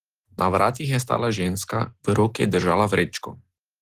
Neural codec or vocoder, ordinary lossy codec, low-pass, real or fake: none; Opus, 16 kbps; 14.4 kHz; real